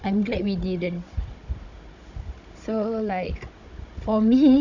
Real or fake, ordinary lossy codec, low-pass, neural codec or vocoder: fake; none; 7.2 kHz; codec, 16 kHz, 16 kbps, FunCodec, trained on Chinese and English, 50 frames a second